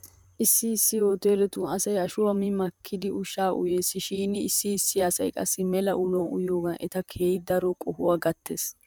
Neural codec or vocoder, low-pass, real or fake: vocoder, 44.1 kHz, 128 mel bands, Pupu-Vocoder; 19.8 kHz; fake